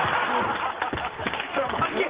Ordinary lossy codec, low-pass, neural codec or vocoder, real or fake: Opus, 16 kbps; 3.6 kHz; none; real